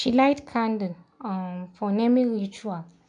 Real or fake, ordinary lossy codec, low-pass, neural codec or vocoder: real; none; 9.9 kHz; none